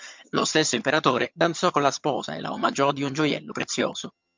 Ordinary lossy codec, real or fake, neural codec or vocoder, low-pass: MP3, 64 kbps; fake; vocoder, 22.05 kHz, 80 mel bands, HiFi-GAN; 7.2 kHz